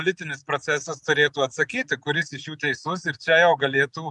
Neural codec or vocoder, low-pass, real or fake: none; 10.8 kHz; real